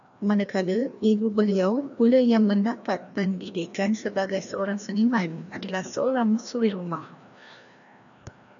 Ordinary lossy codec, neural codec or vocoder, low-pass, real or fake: MP3, 96 kbps; codec, 16 kHz, 1 kbps, FreqCodec, larger model; 7.2 kHz; fake